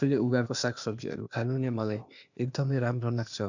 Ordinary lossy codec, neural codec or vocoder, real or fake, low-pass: none; codec, 16 kHz, 0.8 kbps, ZipCodec; fake; 7.2 kHz